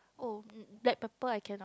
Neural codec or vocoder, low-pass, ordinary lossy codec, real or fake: none; none; none; real